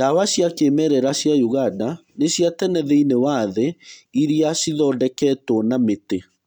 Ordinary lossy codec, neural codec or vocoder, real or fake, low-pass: none; none; real; 19.8 kHz